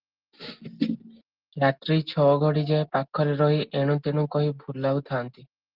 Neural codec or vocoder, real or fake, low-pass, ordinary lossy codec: none; real; 5.4 kHz; Opus, 16 kbps